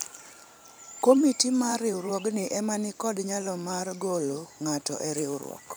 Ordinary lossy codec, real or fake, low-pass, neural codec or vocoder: none; fake; none; vocoder, 44.1 kHz, 128 mel bands every 512 samples, BigVGAN v2